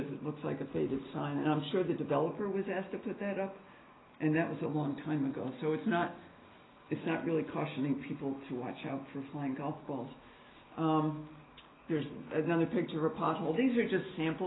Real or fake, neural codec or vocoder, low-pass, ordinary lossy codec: real; none; 7.2 kHz; AAC, 16 kbps